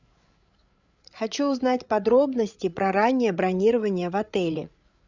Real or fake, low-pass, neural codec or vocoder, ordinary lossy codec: fake; 7.2 kHz; codec, 16 kHz, 8 kbps, FreqCodec, larger model; Opus, 64 kbps